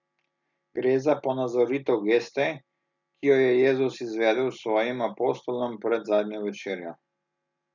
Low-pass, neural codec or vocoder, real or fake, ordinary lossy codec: 7.2 kHz; none; real; none